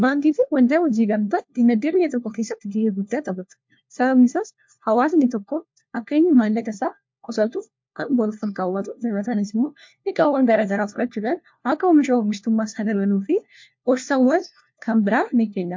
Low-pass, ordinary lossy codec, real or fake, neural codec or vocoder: 7.2 kHz; MP3, 48 kbps; fake; codec, 16 kHz, 1 kbps, FunCodec, trained on LibriTTS, 50 frames a second